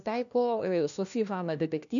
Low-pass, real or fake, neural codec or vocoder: 7.2 kHz; fake; codec, 16 kHz, 1 kbps, FunCodec, trained on LibriTTS, 50 frames a second